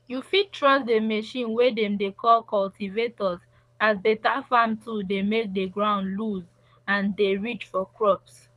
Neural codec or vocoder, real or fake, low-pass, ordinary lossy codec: codec, 24 kHz, 6 kbps, HILCodec; fake; none; none